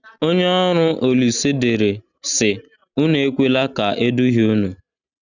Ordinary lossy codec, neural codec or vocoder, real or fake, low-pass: none; none; real; 7.2 kHz